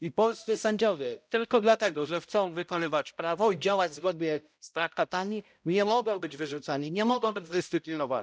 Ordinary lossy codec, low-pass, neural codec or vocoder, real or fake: none; none; codec, 16 kHz, 0.5 kbps, X-Codec, HuBERT features, trained on balanced general audio; fake